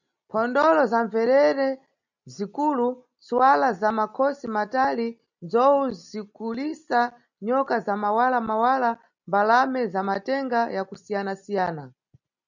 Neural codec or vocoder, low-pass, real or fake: none; 7.2 kHz; real